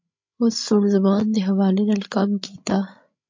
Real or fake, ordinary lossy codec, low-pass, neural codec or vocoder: fake; MP3, 48 kbps; 7.2 kHz; codec, 16 kHz, 16 kbps, FreqCodec, larger model